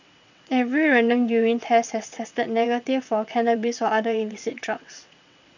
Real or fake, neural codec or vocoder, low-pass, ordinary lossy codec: fake; vocoder, 22.05 kHz, 80 mel bands, WaveNeXt; 7.2 kHz; none